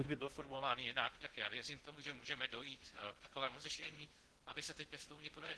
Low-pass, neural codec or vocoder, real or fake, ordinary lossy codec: 10.8 kHz; codec, 16 kHz in and 24 kHz out, 0.6 kbps, FocalCodec, streaming, 2048 codes; fake; Opus, 16 kbps